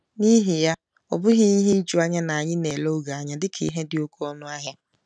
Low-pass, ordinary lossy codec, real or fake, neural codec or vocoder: none; none; real; none